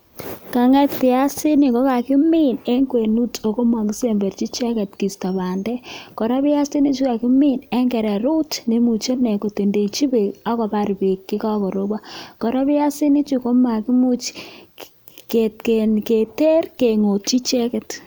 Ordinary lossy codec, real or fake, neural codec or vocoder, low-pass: none; real; none; none